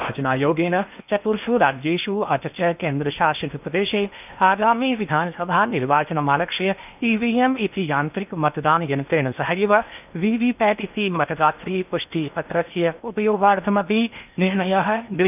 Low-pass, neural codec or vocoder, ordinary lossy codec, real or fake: 3.6 kHz; codec, 16 kHz in and 24 kHz out, 0.8 kbps, FocalCodec, streaming, 65536 codes; none; fake